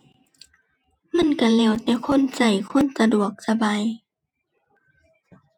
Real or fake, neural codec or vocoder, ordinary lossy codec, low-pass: fake; vocoder, 48 kHz, 128 mel bands, Vocos; none; 19.8 kHz